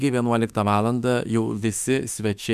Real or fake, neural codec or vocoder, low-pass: fake; autoencoder, 48 kHz, 32 numbers a frame, DAC-VAE, trained on Japanese speech; 14.4 kHz